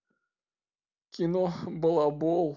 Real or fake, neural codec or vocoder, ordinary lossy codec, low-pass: real; none; none; 7.2 kHz